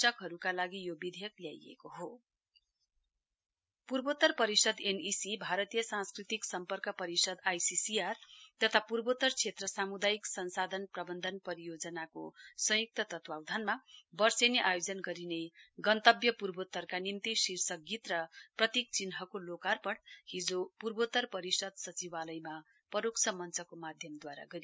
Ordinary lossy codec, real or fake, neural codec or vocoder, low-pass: none; real; none; none